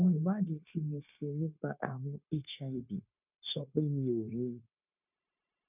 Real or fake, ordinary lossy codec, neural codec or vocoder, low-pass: fake; none; codec, 16 kHz, 0.9 kbps, LongCat-Audio-Codec; 3.6 kHz